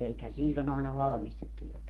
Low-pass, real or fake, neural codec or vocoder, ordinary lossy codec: 14.4 kHz; fake; codec, 32 kHz, 1.9 kbps, SNAC; Opus, 24 kbps